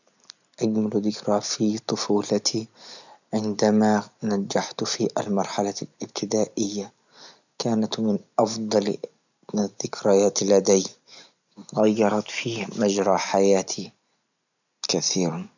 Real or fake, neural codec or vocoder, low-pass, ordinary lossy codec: real; none; 7.2 kHz; none